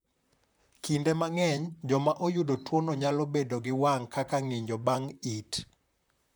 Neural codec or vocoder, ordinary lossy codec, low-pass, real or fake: vocoder, 44.1 kHz, 128 mel bands, Pupu-Vocoder; none; none; fake